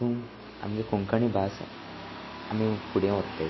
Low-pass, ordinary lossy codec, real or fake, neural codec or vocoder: 7.2 kHz; MP3, 24 kbps; real; none